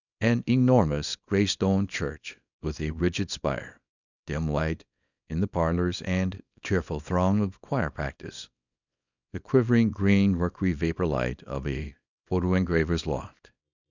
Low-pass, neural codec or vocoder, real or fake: 7.2 kHz; codec, 24 kHz, 0.9 kbps, WavTokenizer, small release; fake